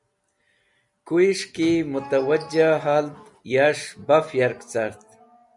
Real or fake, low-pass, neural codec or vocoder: real; 10.8 kHz; none